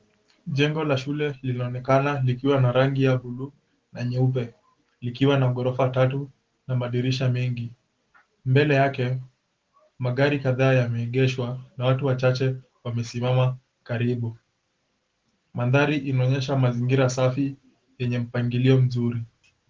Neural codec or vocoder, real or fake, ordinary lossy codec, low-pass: none; real; Opus, 16 kbps; 7.2 kHz